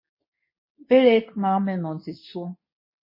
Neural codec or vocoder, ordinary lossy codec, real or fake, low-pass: codec, 24 kHz, 0.9 kbps, WavTokenizer, medium speech release version 2; MP3, 24 kbps; fake; 5.4 kHz